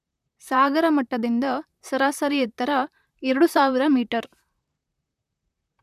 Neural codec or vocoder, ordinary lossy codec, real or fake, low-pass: vocoder, 44.1 kHz, 128 mel bands, Pupu-Vocoder; none; fake; 14.4 kHz